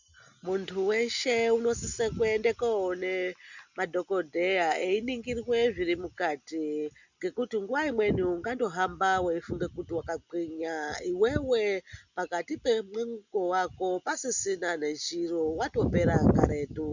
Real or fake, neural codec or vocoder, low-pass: real; none; 7.2 kHz